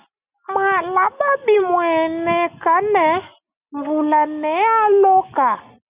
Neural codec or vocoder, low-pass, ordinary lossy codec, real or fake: none; 3.6 kHz; Opus, 64 kbps; real